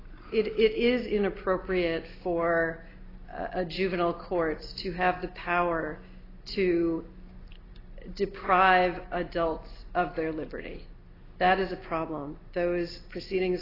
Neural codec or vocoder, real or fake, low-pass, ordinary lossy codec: none; real; 5.4 kHz; AAC, 24 kbps